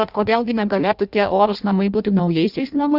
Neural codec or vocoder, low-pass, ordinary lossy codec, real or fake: codec, 16 kHz in and 24 kHz out, 0.6 kbps, FireRedTTS-2 codec; 5.4 kHz; Opus, 64 kbps; fake